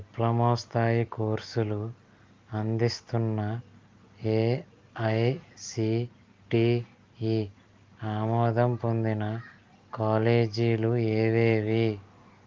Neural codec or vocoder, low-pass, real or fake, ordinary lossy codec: none; 7.2 kHz; real; Opus, 32 kbps